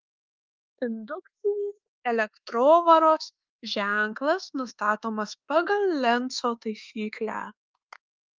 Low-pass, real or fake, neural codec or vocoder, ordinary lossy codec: 7.2 kHz; fake; codec, 24 kHz, 1.2 kbps, DualCodec; Opus, 32 kbps